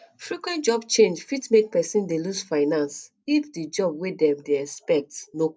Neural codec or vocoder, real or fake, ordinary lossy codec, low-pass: none; real; none; none